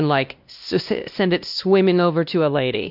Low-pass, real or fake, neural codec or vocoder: 5.4 kHz; fake; codec, 16 kHz, 1 kbps, X-Codec, WavLM features, trained on Multilingual LibriSpeech